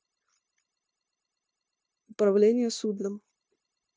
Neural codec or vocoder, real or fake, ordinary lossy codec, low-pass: codec, 16 kHz, 0.9 kbps, LongCat-Audio-Codec; fake; none; none